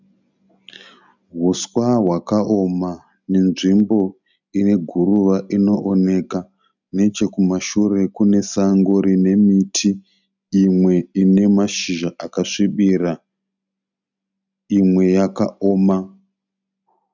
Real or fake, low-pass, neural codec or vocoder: real; 7.2 kHz; none